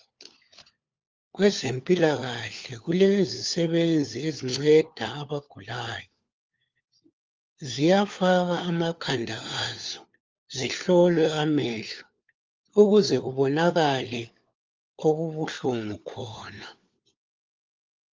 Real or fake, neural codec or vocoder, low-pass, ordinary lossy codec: fake; codec, 16 kHz, 4 kbps, FunCodec, trained on LibriTTS, 50 frames a second; 7.2 kHz; Opus, 32 kbps